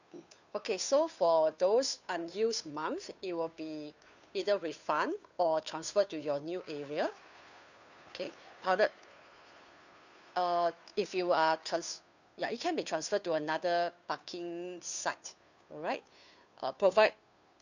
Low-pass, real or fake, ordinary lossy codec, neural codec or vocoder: 7.2 kHz; fake; none; codec, 16 kHz, 2 kbps, FunCodec, trained on Chinese and English, 25 frames a second